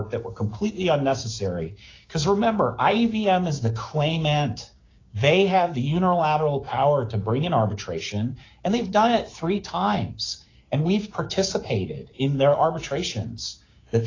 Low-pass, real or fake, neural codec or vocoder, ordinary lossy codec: 7.2 kHz; fake; codec, 16 kHz, 6 kbps, DAC; AAC, 32 kbps